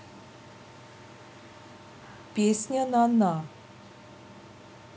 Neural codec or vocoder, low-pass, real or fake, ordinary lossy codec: none; none; real; none